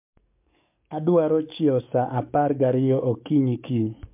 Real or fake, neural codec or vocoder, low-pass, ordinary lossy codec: fake; codec, 44.1 kHz, 7.8 kbps, DAC; 3.6 kHz; MP3, 32 kbps